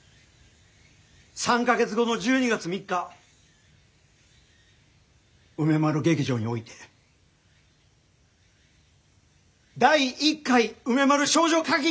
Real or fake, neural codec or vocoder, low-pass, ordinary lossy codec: real; none; none; none